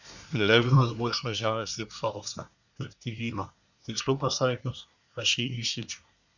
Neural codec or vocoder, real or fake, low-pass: codec, 24 kHz, 1 kbps, SNAC; fake; 7.2 kHz